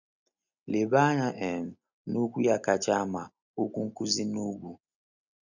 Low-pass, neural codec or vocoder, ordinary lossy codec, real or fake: 7.2 kHz; none; none; real